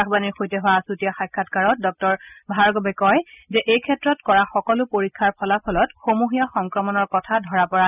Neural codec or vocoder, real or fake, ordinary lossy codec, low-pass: none; real; none; 3.6 kHz